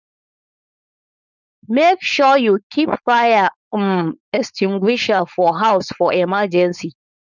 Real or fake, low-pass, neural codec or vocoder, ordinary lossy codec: fake; 7.2 kHz; codec, 16 kHz, 4.8 kbps, FACodec; none